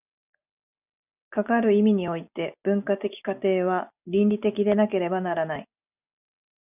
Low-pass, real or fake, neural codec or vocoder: 3.6 kHz; real; none